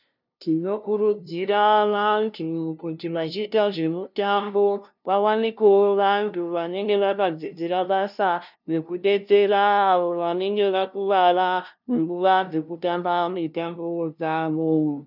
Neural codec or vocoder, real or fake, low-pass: codec, 16 kHz, 0.5 kbps, FunCodec, trained on LibriTTS, 25 frames a second; fake; 5.4 kHz